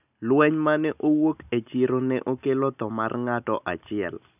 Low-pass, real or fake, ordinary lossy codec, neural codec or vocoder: 3.6 kHz; real; none; none